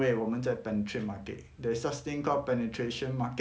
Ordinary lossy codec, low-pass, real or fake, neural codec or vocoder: none; none; real; none